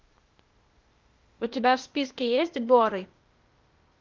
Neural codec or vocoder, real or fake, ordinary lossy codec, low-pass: codec, 16 kHz, 0.8 kbps, ZipCodec; fake; Opus, 24 kbps; 7.2 kHz